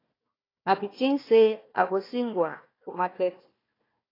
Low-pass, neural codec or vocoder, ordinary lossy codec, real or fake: 5.4 kHz; codec, 16 kHz, 1 kbps, FunCodec, trained on Chinese and English, 50 frames a second; AAC, 24 kbps; fake